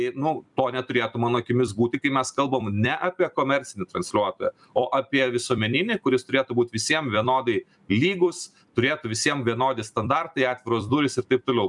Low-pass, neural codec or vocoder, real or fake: 10.8 kHz; vocoder, 44.1 kHz, 128 mel bands every 256 samples, BigVGAN v2; fake